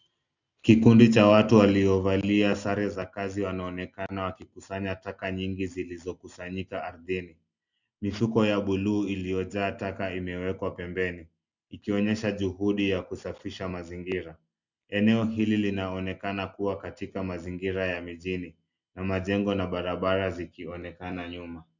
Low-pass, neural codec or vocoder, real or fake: 7.2 kHz; none; real